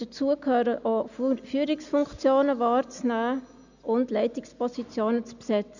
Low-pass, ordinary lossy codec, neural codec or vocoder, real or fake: 7.2 kHz; none; none; real